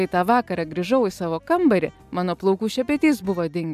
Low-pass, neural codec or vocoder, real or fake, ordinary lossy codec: 14.4 kHz; none; real; MP3, 96 kbps